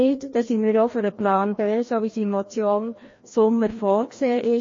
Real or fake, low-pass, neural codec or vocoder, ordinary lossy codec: fake; 7.2 kHz; codec, 16 kHz, 1 kbps, FreqCodec, larger model; MP3, 32 kbps